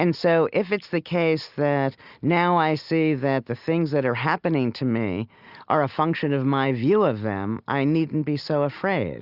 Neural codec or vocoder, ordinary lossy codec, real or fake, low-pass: none; Opus, 64 kbps; real; 5.4 kHz